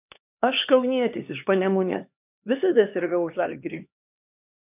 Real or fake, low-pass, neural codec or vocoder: fake; 3.6 kHz; codec, 16 kHz, 2 kbps, X-Codec, HuBERT features, trained on LibriSpeech